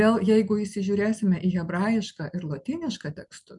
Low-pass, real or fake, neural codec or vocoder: 10.8 kHz; real; none